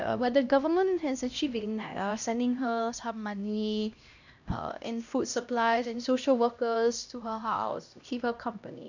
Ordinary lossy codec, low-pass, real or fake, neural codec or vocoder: none; 7.2 kHz; fake; codec, 16 kHz, 1 kbps, X-Codec, HuBERT features, trained on LibriSpeech